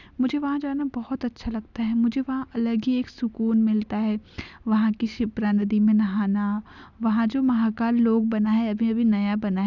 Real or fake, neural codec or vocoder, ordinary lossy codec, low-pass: real; none; none; 7.2 kHz